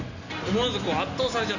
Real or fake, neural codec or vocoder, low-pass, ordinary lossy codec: real; none; 7.2 kHz; none